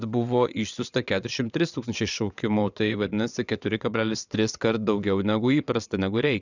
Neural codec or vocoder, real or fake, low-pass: vocoder, 22.05 kHz, 80 mel bands, WaveNeXt; fake; 7.2 kHz